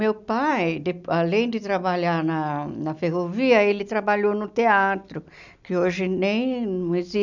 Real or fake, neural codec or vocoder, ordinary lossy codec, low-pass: real; none; none; 7.2 kHz